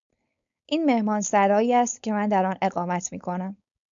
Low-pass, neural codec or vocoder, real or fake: 7.2 kHz; codec, 16 kHz, 4.8 kbps, FACodec; fake